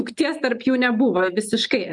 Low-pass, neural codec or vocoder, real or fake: 10.8 kHz; none; real